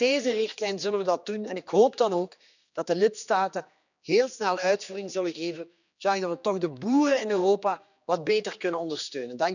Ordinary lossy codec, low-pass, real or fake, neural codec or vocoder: none; 7.2 kHz; fake; codec, 16 kHz, 2 kbps, X-Codec, HuBERT features, trained on general audio